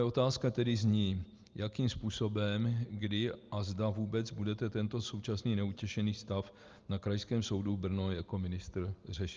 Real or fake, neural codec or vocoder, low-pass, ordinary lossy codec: real; none; 7.2 kHz; Opus, 24 kbps